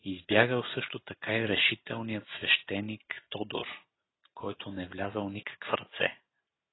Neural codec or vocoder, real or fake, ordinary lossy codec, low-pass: none; real; AAC, 16 kbps; 7.2 kHz